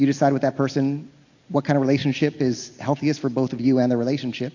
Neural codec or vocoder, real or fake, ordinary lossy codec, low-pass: none; real; AAC, 48 kbps; 7.2 kHz